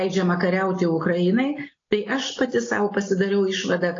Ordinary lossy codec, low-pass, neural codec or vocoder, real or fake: AAC, 32 kbps; 7.2 kHz; none; real